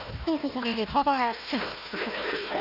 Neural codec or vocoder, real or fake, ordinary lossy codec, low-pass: codec, 16 kHz, 1 kbps, FunCodec, trained on Chinese and English, 50 frames a second; fake; none; 5.4 kHz